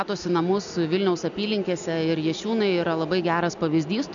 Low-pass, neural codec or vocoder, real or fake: 7.2 kHz; none; real